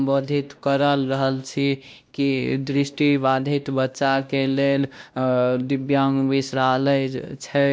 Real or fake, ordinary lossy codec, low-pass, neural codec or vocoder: fake; none; none; codec, 16 kHz, 1 kbps, X-Codec, WavLM features, trained on Multilingual LibriSpeech